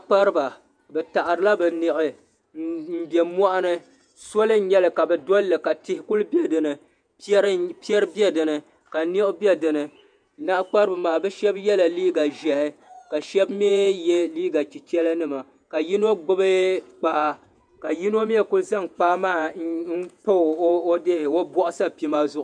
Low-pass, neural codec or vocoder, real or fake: 9.9 kHz; vocoder, 48 kHz, 128 mel bands, Vocos; fake